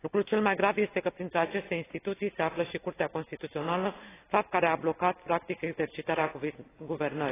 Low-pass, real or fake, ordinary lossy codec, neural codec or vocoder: 3.6 kHz; real; AAC, 16 kbps; none